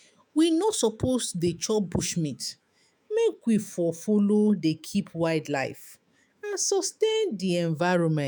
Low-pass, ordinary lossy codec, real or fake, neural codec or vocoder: none; none; fake; autoencoder, 48 kHz, 128 numbers a frame, DAC-VAE, trained on Japanese speech